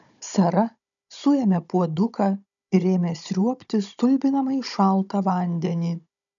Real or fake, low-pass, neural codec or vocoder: fake; 7.2 kHz; codec, 16 kHz, 16 kbps, FunCodec, trained on Chinese and English, 50 frames a second